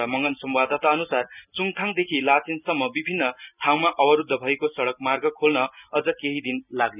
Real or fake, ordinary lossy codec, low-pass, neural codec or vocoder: real; none; 3.6 kHz; none